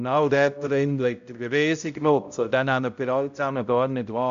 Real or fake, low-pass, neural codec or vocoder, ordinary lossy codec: fake; 7.2 kHz; codec, 16 kHz, 0.5 kbps, X-Codec, HuBERT features, trained on balanced general audio; AAC, 64 kbps